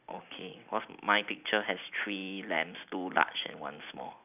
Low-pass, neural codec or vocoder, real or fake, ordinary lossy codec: 3.6 kHz; none; real; none